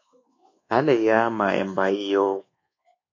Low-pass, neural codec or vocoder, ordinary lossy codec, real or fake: 7.2 kHz; codec, 24 kHz, 1.2 kbps, DualCodec; AAC, 48 kbps; fake